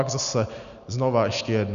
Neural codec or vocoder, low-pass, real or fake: none; 7.2 kHz; real